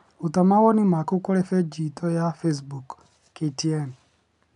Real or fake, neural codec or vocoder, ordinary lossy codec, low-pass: real; none; none; 10.8 kHz